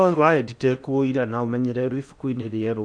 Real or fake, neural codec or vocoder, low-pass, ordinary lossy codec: fake; codec, 16 kHz in and 24 kHz out, 0.8 kbps, FocalCodec, streaming, 65536 codes; 9.9 kHz; none